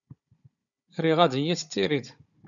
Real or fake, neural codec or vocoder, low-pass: fake; codec, 16 kHz, 16 kbps, FunCodec, trained on Chinese and English, 50 frames a second; 7.2 kHz